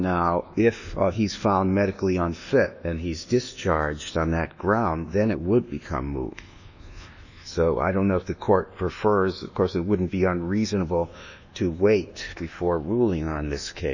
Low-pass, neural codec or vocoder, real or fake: 7.2 kHz; codec, 24 kHz, 1.2 kbps, DualCodec; fake